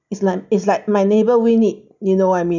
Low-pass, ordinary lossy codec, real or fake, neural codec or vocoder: 7.2 kHz; none; real; none